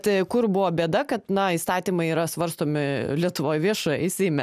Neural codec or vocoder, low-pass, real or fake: none; 14.4 kHz; real